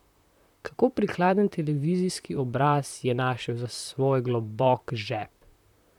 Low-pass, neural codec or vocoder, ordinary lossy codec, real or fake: 19.8 kHz; vocoder, 44.1 kHz, 128 mel bands, Pupu-Vocoder; none; fake